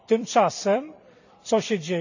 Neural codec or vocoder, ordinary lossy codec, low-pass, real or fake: vocoder, 44.1 kHz, 128 mel bands every 256 samples, BigVGAN v2; none; 7.2 kHz; fake